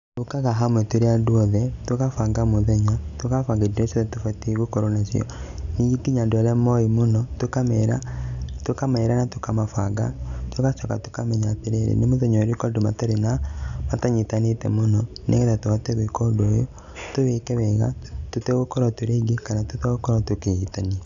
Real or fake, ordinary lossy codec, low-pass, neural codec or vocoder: real; none; 7.2 kHz; none